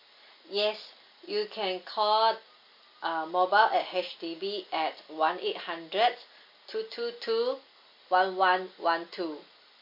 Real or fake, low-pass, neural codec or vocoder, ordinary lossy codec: real; 5.4 kHz; none; MP3, 32 kbps